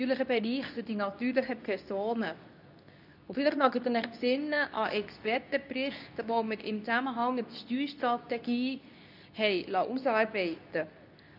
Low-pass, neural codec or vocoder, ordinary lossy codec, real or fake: 5.4 kHz; codec, 24 kHz, 0.9 kbps, WavTokenizer, medium speech release version 2; none; fake